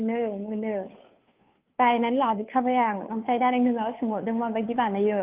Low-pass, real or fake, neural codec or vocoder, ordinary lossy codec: 3.6 kHz; fake; codec, 16 kHz, 2 kbps, FunCodec, trained on Chinese and English, 25 frames a second; Opus, 32 kbps